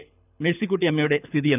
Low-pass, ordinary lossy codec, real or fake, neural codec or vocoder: 3.6 kHz; none; fake; codec, 24 kHz, 6 kbps, HILCodec